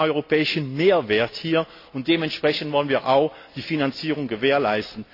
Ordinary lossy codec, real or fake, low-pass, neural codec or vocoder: AAC, 32 kbps; real; 5.4 kHz; none